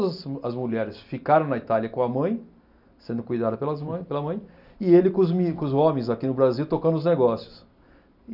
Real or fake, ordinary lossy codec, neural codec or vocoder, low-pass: real; none; none; 5.4 kHz